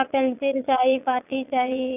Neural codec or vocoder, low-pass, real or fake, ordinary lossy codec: vocoder, 22.05 kHz, 80 mel bands, Vocos; 3.6 kHz; fake; none